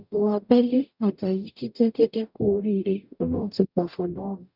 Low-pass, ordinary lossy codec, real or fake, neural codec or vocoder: 5.4 kHz; none; fake; codec, 44.1 kHz, 0.9 kbps, DAC